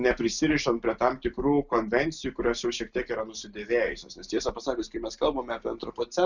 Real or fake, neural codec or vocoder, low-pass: real; none; 7.2 kHz